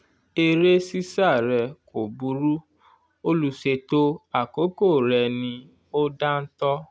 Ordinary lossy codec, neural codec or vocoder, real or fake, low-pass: none; none; real; none